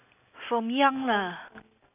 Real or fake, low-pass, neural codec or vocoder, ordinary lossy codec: real; 3.6 kHz; none; none